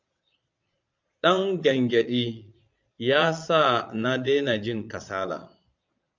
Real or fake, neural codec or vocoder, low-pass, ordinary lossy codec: fake; vocoder, 22.05 kHz, 80 mel bands, Vocos; 7.2 kHz; MP3, 64 kbps